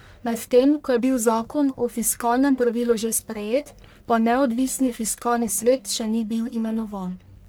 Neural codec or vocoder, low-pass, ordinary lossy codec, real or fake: codec, 44.1 kHz, 1.7 kbps, Pupu-Codec; none; none; fake